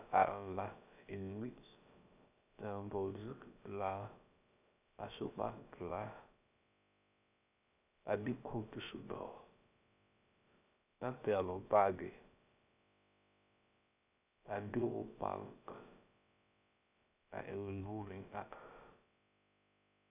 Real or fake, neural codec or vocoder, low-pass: fake; codec, 16 kHz, about 1 kbps, DyCAST, with the encoder's durations; 3.6 kHz